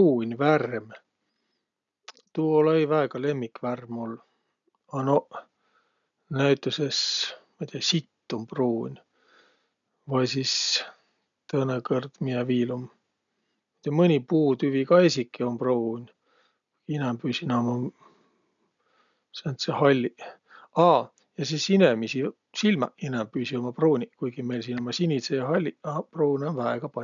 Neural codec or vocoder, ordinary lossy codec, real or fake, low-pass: none; none; real; 7.2 kHz